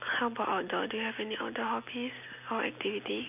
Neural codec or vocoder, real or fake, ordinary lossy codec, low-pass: none; real; none; 3.6 kHz